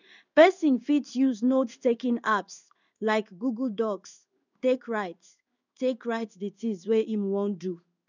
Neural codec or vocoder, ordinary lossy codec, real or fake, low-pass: codec, 16 kHz in and 24 kHz out, 1 kbps, XY-Tokenizer; none; fake; 7.2 kHz